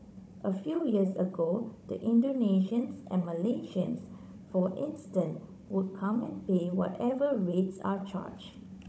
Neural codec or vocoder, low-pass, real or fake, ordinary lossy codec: codec, 16 kHz, 16 kbps, FunCodec, trained on Chinese and English, 50 frames a second; none; fake; none